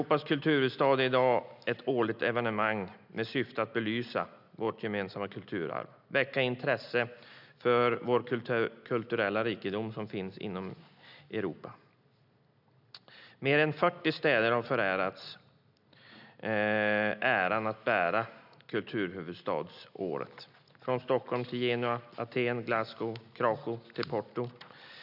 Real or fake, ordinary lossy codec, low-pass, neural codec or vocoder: real; none; 5.4 kHz; none